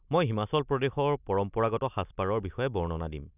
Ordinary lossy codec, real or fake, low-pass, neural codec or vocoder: none; real; 3.6 kHz; none